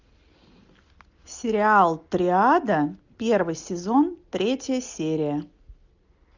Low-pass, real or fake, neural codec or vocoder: 7.2 kHz; real; none